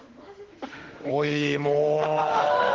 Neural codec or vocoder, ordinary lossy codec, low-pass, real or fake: autoencoder, 48 kHz, 32 numbers a frame, DAC-VAE, trained on Japanese speech; Opus, 16 kbps; 7.2 kHz; fake